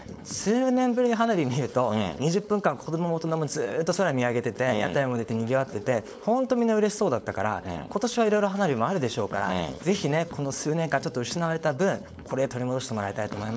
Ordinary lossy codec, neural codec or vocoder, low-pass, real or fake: none; codec, 16 kHz, 4.8 kbps, FACodec; none; fake